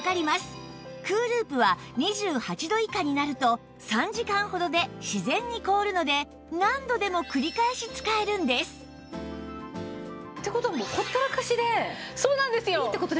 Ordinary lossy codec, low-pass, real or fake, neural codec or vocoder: none; none; real; none